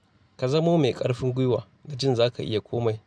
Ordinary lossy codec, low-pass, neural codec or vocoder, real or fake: none; none; none; real